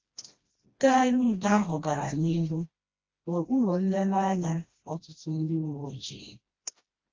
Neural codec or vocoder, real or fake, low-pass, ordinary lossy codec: codec, 16 kHz, 1 kbps, FreqCodec, smaller model; fake; 7.2 kHz; Opus, 32 kbps